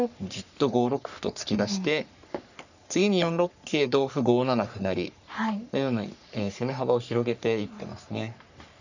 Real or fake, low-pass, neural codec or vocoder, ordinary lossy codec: fake; 7.2 kHz; codec, 44.1 kHz, 3.4 kbps, Pupu-Codec; none